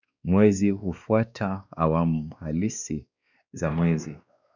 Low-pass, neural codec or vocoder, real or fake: 7.2 kHz; codec, 16 kHz, 2 kbps, X-Codec, WavLM features, trained on Multilingual LibriSpeech; fake